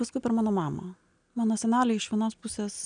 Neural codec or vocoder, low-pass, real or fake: none; 9.9 kHz; real